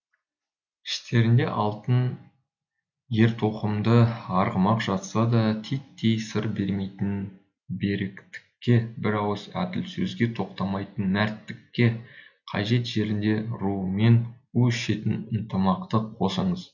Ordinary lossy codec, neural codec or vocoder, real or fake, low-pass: none; none; real; 7.2 kHz